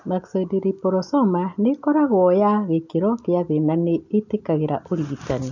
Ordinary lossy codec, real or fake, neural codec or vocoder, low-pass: none; real; none; 7.2 kHz